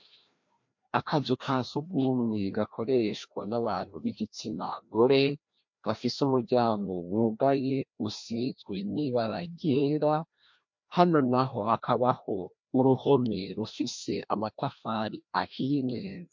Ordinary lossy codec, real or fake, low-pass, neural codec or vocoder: MP3, 48 kbps; fake; 7.2 kHz; codec, 16 kHz, 1 kbps, FreqCodec, larger model